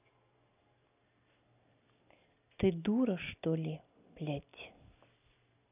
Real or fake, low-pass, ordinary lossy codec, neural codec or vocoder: real; 3.6 kHz; none; none